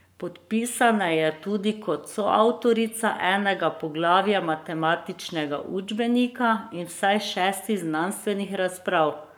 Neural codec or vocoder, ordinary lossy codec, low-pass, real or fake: codec, 44.1 kHz, 7.8 kbps, Pupu-Codec; none; none; fake